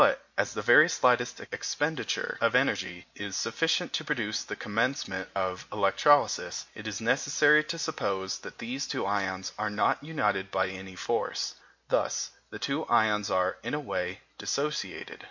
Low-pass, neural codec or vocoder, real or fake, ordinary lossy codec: 7.2 kHz; none; real; MP3, 48 kbps